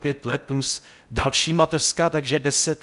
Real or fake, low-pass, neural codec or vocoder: fake; 10.8 kHz; codec, 16 kHz in and 24 kHz out, 0.6 kbps, FocalCodec, streaming, 4096 codes